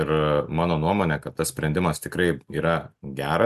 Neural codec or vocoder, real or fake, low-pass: none; real; 14.4 kHz